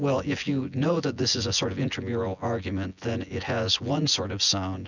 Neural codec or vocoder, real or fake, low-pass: vocoder, 24 kHz, 100 mel bands, Vocos; fake; 7.2 kHz